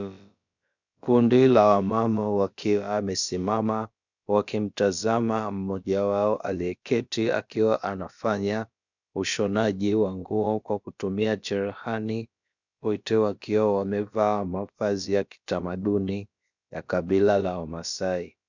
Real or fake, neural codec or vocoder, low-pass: fake; codec, 16 kHz, about 1 kbps, DyCAST, with the encoder's durations; 7.2 kHz